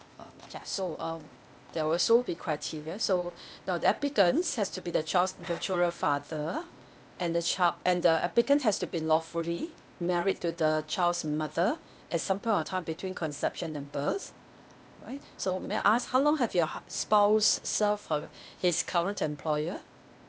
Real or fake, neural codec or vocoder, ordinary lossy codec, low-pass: fake; codec, 16 kHz, 0.8 kbps, ZipCodec; none; none